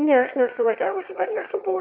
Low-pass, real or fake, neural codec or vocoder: 5.4 kHz; fake; autoencoder, 22.05 kHz, a latent of 192 numbers a frame, VITS, trained on one speaker